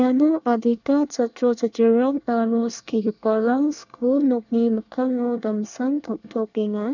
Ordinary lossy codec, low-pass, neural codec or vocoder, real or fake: none; 7.2 kHz; codec, 24 kHz, 1 kbps, SNAC; fake